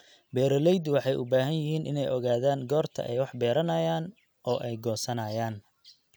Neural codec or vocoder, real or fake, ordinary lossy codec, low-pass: none; real; none; none